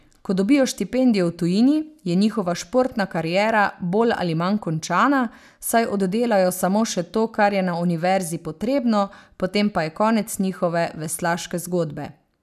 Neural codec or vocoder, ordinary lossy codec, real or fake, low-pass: none; none; real; 14.4 kHz